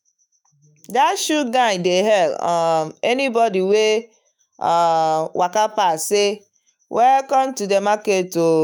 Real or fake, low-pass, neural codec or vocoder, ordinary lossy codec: fake; none; autoencoder, 48 kHz, 128 numbers a frame, DAC-VAE, trained on Japanese speech; none